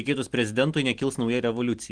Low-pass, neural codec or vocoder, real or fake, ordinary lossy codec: 9.9 kHz; none; real; Opus, 24 kbps